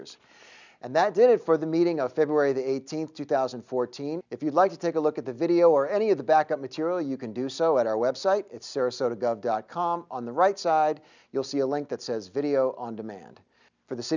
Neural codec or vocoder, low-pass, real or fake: none; 7.2 kHz; real